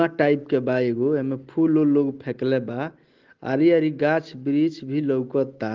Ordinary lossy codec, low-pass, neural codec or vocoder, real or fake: Opus, 16 kbps; 7.2 kHz; none; real